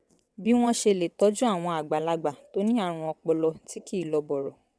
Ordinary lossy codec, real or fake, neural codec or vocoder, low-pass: none; fake; vocoder, 22.05 kHz, 80 mel bands, WaveNeXt; none